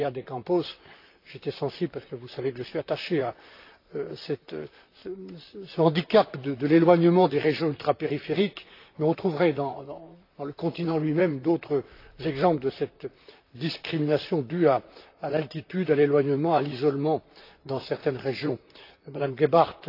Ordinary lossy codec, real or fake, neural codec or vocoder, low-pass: AAC, 32 kbps; fake; vocoder, 44.1 kHz, 128 mel bands, Pupu-Vocoder; 5.4 kHz